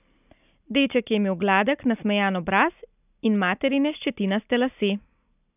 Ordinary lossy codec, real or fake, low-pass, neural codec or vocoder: none; real; 3.6 kHz; none